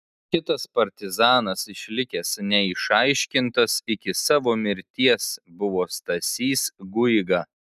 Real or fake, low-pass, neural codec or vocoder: real; 14.4 kHz; none